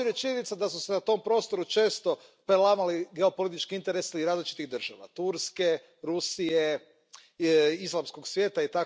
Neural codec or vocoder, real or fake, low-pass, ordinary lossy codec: none; real; none; none